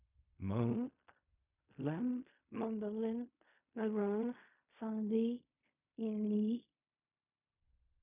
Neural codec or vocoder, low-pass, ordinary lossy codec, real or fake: codec, 16 kHz in and 24 kHz out, 0.4 kbps, LongCat-Audio-Codec, fine tuned four codebook decoder; 3.6 kHz; none; fake